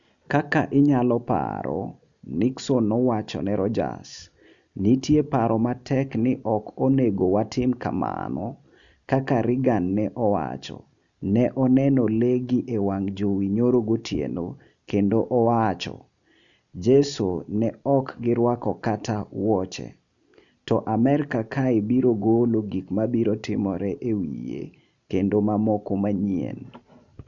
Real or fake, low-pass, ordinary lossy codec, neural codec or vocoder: real; 7.2 kHz; none; none